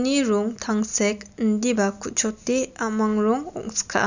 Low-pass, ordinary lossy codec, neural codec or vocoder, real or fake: 7.2 kHz; none; none; real